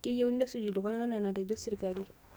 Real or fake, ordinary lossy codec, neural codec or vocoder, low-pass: fake; none; codec, 44.1 kHz, 2.6 kbps, SNAC; none